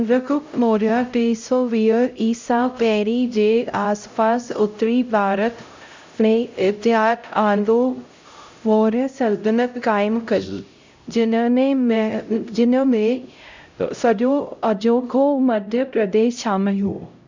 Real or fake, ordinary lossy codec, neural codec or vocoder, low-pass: fake; MP3, 64 kbps; codec, 16 kHz, 0.5 kbps, X-Codec, HuBERT features, trained on LibriSpeech; 7.2 kHz